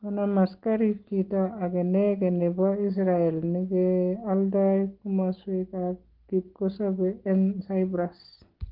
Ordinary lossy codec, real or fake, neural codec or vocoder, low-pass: Opus, 16 kbps; real; none; 5.4 kHz